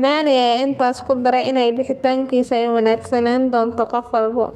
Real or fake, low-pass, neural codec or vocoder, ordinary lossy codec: fake; 14.4 kHz; codec, 32 kHz, 1.9 kbps, SNAC; none